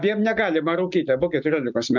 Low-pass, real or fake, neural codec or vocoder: 7.2 kHz; real; none